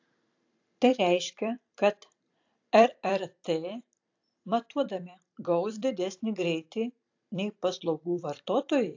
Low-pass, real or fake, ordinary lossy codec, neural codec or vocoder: 7.2 kHz; fake; AAC, 48 kbps; vocoder, 44.1 kHz, 128 mel bands every 256 samples, BigVGAN v2